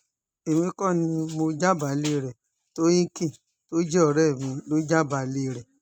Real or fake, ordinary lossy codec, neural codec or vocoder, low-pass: real; none; none; 19.8 kHz